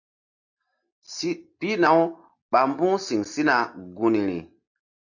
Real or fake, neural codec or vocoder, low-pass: real; none; 7.2 kHz